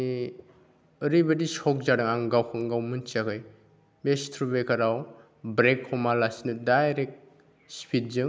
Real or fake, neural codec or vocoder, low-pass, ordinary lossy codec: real; none; none; none